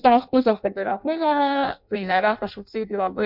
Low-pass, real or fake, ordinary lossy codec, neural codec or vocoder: 5.4 kHz; fake; MP3, 48 kbps; codec, 16 kHz in and 24 kHz out, 0.6 kbps, FireRedTTS-2 codec